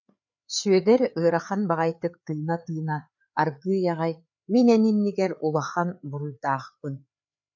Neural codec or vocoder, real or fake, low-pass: codec, 16 kHz, 4 kbps, FreqCodec, larger model; fake; 7.2 kHz